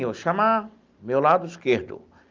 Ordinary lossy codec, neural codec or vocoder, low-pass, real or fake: Opus, 32 kbps; none; 7.2 kHz; real